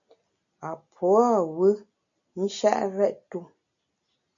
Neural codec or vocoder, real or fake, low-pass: none; real; 7.2 kHz